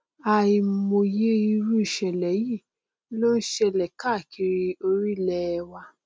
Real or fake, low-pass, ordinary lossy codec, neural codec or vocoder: real; none; none; none